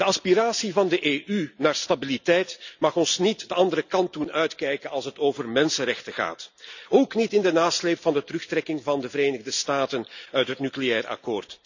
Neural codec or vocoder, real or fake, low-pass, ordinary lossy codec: none; real; 7.2 kHz; none